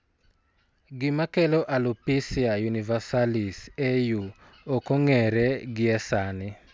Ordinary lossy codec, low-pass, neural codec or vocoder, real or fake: none; none; none; real